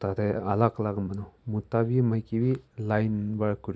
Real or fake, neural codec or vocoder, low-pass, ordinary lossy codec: real; none; none; none